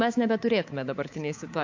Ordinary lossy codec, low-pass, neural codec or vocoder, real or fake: AAC, 48 kbps; 7.2 kHz; codec, 24 kHz, 3.1 kbps, DualCodec; fake